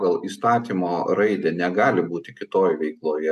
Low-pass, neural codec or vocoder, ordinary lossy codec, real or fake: 14.4 kHz; none; AAC, 96 kbps; real